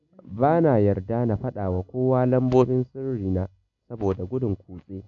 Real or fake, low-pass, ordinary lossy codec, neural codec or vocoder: real; 7.2 kHz; none; none